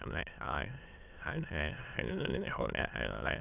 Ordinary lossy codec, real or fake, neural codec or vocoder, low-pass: none; fake; autoencoder, 22.05 kHz, a latent of 192 numbers a frame, VITS, trained on many speakers; 3.6 kHz